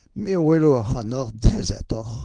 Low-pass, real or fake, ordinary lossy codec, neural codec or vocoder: 9.9 kHz; fake; Opus, 24 kbps; codec, 24 kHz, 0.9 kbps, WavTokenizer, small release